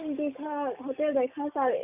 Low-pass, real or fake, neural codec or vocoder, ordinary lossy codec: 3.6 kHz; real; none; none